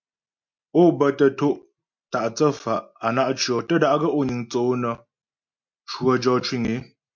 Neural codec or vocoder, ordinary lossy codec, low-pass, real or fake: none; MP3, 64 kbps; 7.2 kHz; real